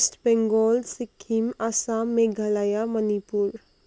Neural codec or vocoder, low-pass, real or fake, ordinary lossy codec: none; none; real; none